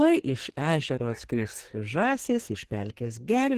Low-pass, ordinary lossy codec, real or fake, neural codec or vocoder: 14.4 kHz; Opus, 16 kbps; fake; codec, 44.1 kHz, 2.6 kbps, SNAC